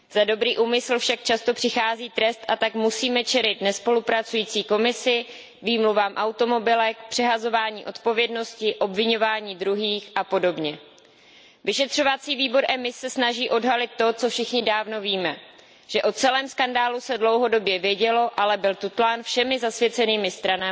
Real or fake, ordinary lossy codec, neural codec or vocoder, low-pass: real; none; none; none